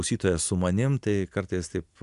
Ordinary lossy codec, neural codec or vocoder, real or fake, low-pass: AAC, 96 kbps; none; real; 10.8 kHz